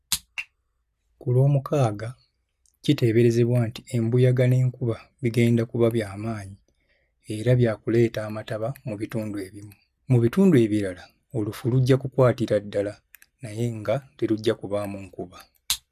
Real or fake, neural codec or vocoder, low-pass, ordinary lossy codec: real; none; 14.4 kHz; none